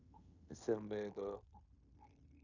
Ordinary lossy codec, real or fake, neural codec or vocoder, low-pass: none; fake; codec, 16 kHz in and 24 kHz out, 0.9 kbps, LongCat-Audio-Codec, fine tuned four codebook decoder; 7.2 kHz